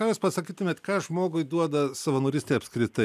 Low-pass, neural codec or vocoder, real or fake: 14.4 kHz; none; real